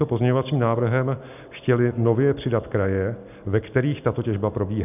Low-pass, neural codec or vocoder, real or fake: 3.6 kHz; none; real